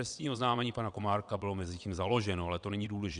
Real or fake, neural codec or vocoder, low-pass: fake; vocoder, 22.05 kHz, 80 mel bands, WaveNeXt; 9.9 kHz